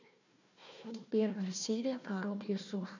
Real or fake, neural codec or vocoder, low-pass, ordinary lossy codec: fake; codec, 16 kHz, 1 kbps, FunCodec, trained on Chinese and English, 50 frames a second; 7.2 kHz; none